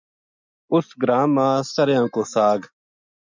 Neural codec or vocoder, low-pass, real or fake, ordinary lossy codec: none; 7.2 kHz; real; MP3, 64 kbps